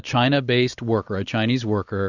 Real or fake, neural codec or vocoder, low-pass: real; none; 7.2 kHz